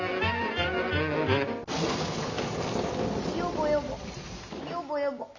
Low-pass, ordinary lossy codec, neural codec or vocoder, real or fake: 7.2 kHz; none; none; real